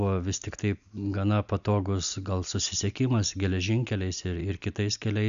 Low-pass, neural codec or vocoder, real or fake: 7.2 kHz; none; real